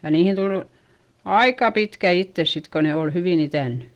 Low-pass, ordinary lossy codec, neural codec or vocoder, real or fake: 19.8 kHz; Opus, 16 kbps; none; real